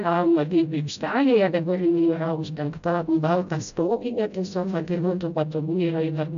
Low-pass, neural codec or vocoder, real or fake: 7.2 kHz; codec, 16 kHz, 0.5 kbps, FreqCodec, smaller model; fake